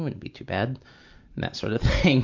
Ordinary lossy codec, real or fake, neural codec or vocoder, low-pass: MP3, 64 kbps; real; none; 7.2 kHz